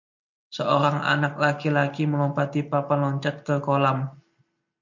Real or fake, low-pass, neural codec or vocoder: real; 7.2 kHz; none